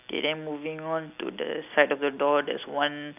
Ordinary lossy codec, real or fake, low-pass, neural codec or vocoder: none; real; 3.6 kHz; none